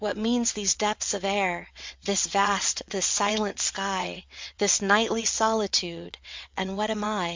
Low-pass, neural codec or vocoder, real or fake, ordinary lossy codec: 7.2 kHz; vocoder, 22.05 kHz, 80 mel bands, WaveNeXt; fake; MP3, 64 kbps